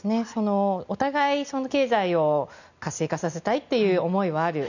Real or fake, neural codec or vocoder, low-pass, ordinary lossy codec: real; none; 7.2 kHz; none